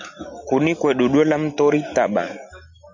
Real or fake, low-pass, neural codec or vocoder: real; 7.2 kHz; none